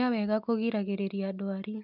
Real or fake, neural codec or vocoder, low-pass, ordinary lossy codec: real; none; 5.4 kHz; none